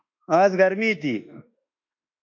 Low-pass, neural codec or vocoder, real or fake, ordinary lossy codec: 7.2 kHz; autoencoder, 48 kHz, 32 numbers a frame, DAC-VAE, trained on Japanese speech; fake; AAC, 48 kbps